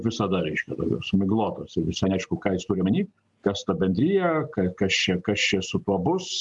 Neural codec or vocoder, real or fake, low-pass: none; real; 10.8 kHz